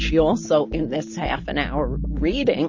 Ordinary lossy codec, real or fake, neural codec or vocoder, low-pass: MP3, 32 kbps; real; none; 7.2 kHz